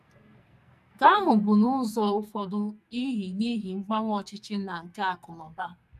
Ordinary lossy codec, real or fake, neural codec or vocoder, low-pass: none; fake; codec, 44.1 kHz, 2.6 kbps, SNAC; 14.4 kHz